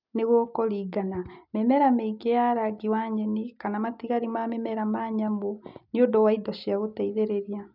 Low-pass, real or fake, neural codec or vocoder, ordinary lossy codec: 5.4 kHz; real; none; none